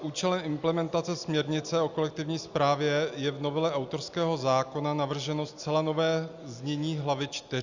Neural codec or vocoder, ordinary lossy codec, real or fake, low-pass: none; Opus, 64 kbps; real; 7.2 kHz